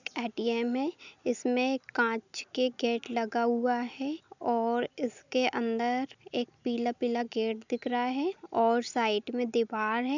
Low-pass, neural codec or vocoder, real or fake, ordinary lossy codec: 7.2 kHz; none; real; none